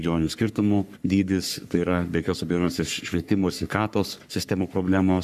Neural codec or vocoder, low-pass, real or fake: codec, 44.1 kHz, 3.4 kbps, Pupu-Codec; 14.4 kHz; fake